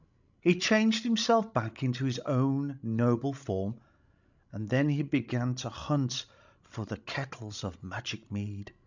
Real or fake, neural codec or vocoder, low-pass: fake; codec, 16 kHz, 16 kbps, FreqCodec, larger model; 7.2 kHz